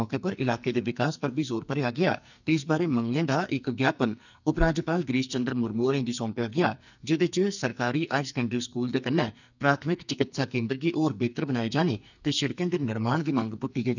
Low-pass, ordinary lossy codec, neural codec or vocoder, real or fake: 7.2 kHz; none; codec, 44.1 kHz, 2.6 kbps, SNAC; fake